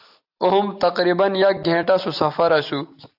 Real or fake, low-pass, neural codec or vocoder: real; 5.4 kHz; none